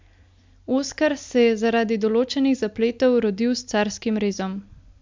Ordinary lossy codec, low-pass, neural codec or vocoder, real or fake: MP3, 64 kbps; 7.2 kHz; none; real